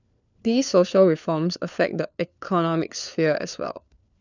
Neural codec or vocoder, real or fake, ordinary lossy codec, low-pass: codec, 16 kHz, 4 kbps, FunCodec, trained on LibriTTS, 50 frames a second; fake; none; 7.2 kHz